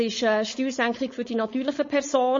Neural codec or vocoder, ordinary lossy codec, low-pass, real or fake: codec, 16 kHz, 4.8 kbps, FACodec; MP3, 32 kbps; 7.2 kHz; fake